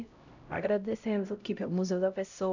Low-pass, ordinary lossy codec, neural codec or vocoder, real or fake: 7.2 kHz; none; codec, 16 kHz, 0.5 kbps, X-Codec, HuBERT features, trained on LibriSpeech; fake